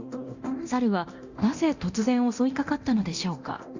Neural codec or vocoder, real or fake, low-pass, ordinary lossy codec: codec, 24 kHz, 0.9 kbps, DualCodec; fake; 7.2 kHz; Opus, 64 kbps